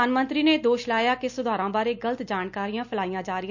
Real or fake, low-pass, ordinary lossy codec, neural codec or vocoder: real; 7.2 kHz; none; none